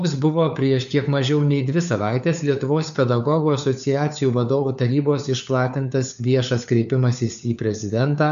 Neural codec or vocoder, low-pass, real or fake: codec, 16 kHz, 4 kbps, FunCodec, trained on LibriTTS, 50 frames a second; 7.2 kHz; fake